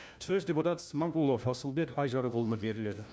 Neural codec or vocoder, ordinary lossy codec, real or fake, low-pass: codec, 16 kHz, 1 kbps, FunCodec, trained on LibriTTS, 50 frames a second; none; fake; none